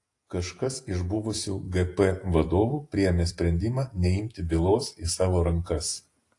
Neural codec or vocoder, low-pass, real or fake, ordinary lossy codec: vocoder, 48 kHz, 128 mel bands, Vocos; 10.8 kHz; fake; AAC, 48 kbps